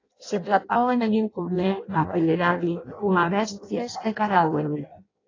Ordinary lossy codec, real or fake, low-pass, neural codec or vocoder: AAC, 32 kbps; fake; 7.2 kHz; codec, 16 kHz in and 24 kHz out, 0.6 kbps, FireRedTTS-2 codec